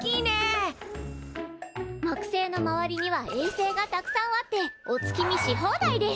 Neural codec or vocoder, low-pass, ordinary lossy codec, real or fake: none; none; none; real